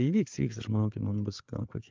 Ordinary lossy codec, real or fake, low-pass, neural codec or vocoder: Opus, 24 kbps; fake; 7.2 kHz; codec, 16 kHz, 4 kbps, FreqCodec, larger model